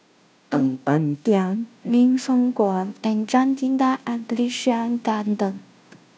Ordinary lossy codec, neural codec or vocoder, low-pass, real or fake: none; codec, 16 kHz, 0.5 kbps, FunCodec, trained on Chinese and English, 25 frames a second; none; fake